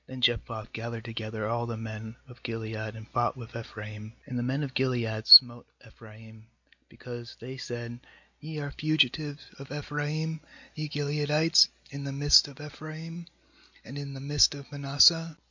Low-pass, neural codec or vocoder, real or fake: 7.2 kHz; none; real